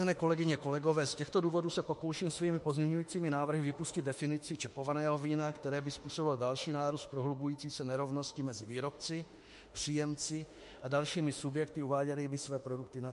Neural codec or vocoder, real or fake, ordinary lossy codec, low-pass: autoencoder, 48 kHz, 32 numbers a frame, DAC-VAE, trained on Japanese speech; fake; MP3, 48 kbps; 14.4 kHz